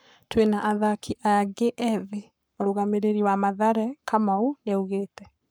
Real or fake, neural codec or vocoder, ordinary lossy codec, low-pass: fake; codec, 44.1 kHz, 7.8 kbps, Pupu-Codec; none; none